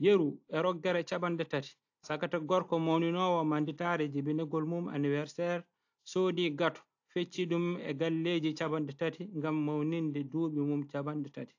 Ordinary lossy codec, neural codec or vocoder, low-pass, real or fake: AAC, 48 kbps; none; 7.2 kHz; real